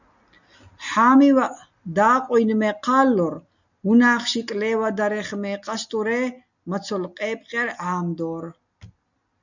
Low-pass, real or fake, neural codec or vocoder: 7.2 kHz; real; none